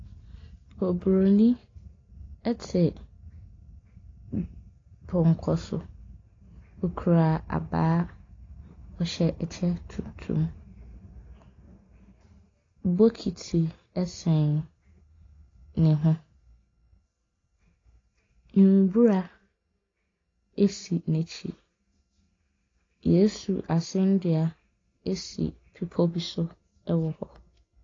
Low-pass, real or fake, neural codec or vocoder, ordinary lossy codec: 7.2 kHz; real; none; AAC, 32 kbps